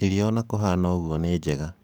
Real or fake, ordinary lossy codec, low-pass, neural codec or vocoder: fake; none; none; codec, 44.1 kHz, 7.8 kbps, DAC